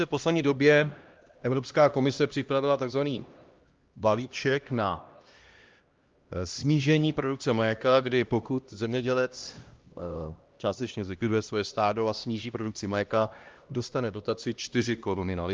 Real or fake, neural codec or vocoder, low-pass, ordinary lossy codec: fake; codec, 16 kHz, 1 kbps, X-Codec, HuBERT features, trained on LibriSpeech; 7.2 kHz; Opus, 32 kbps